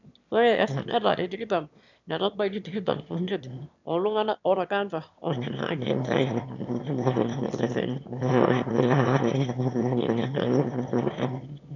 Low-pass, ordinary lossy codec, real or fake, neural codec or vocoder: 7.2 kHz; none; fake; autoencoder, 22.05 kHz, a latent of 192 numbers a frame, VITS, trained on one speaker